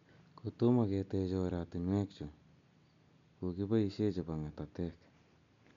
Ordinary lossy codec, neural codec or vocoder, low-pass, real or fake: MP3, 64 kbps; none; 7.2 kHz; real